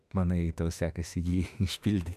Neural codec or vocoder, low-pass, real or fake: autoencoder, 48 kHz, 32 numbers a frame, DAC-VAE, trained on Japanese speech; 14.4 kHz; fake